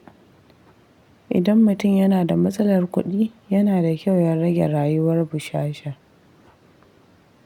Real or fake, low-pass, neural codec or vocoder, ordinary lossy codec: real; 19.8 kHz; none; none